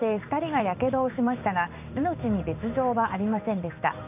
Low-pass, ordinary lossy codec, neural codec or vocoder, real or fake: 3.6 kHz; none; codec, 16 kHz in and 24 kHz out, 1 kbps, XY-Tokenizer; fake